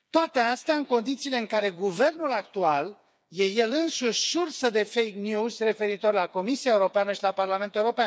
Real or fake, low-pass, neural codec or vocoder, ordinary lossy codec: fake; none; codec, 16 kHz, 4 kbps, FreqCodec, smaller model; none